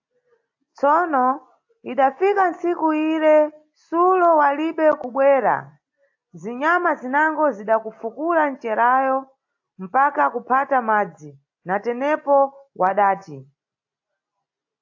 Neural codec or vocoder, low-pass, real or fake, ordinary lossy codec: none; 7.2 kHz; real; AAC, 48 kbps